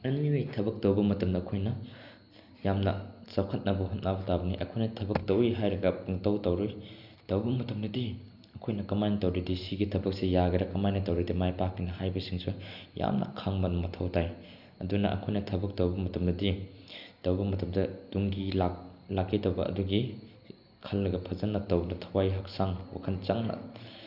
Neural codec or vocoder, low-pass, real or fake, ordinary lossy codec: none; 5.4 kHz; real; none